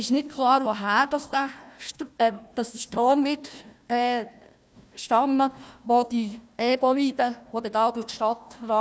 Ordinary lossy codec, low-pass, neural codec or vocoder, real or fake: none; none; codec, 16 kHz, 1 kbps, FunCodec, trained on Chinese and English, 50 frames a second; fake